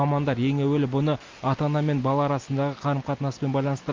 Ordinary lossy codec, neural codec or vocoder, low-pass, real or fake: Opus, 32 kbps; none; 7.2 kHz; real